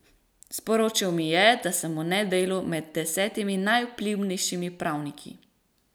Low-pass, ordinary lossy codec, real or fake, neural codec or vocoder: none; none; real; none